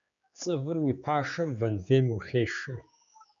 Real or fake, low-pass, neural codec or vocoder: fake; 7.2 kHz; codec, 16 kHz, 4 kbps, X-Codec, HuBERT features, trained on general audio